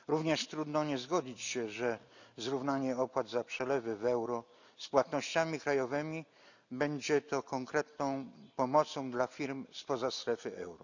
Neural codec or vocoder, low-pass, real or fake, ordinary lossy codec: none; 7.2 kHz; real; none